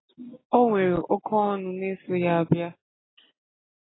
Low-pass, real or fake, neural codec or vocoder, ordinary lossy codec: 7.2 kHz; real; none; AAC, 16 kbps